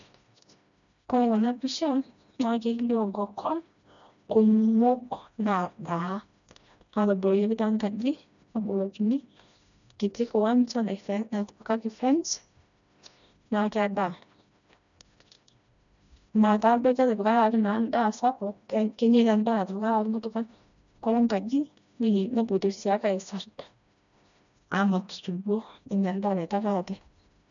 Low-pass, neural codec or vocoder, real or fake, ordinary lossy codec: 7.2 kHz; codec, 16 kHz, 1 kbps, FreqCodec, smaller model; fake; none